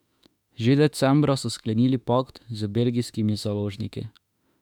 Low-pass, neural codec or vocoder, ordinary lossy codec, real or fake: 19.8 kHz; autoencoder, 48 kHz, 32 numbers a frame, DAC-VAE, trained on Japanese speech; none; fake